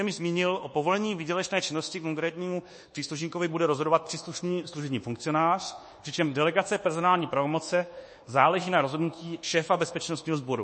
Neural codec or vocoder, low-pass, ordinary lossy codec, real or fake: codec, 24 kHz, 1.2 kbps, DualCodec; 10.8 kHz; MP3, 32 kbps; fake